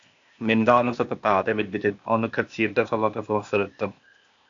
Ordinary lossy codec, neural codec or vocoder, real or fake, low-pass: AAC, 64 kbps; codec, 16 kHz, 0.8 kbps, ZipCodec; fake; 7.2 kHz